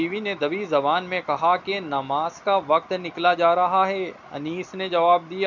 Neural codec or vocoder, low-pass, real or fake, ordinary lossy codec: none; 7.2 kHz; real; none